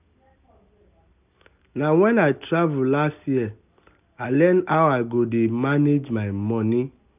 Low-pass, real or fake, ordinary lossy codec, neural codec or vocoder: 3.6 kHz; real; none; none